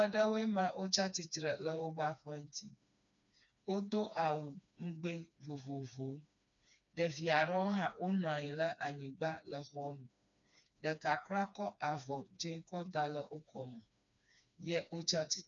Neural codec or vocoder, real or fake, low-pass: codec, 16 kHz, 2 kbps, FreqCodec, smaller model; fake; 7.2 kHz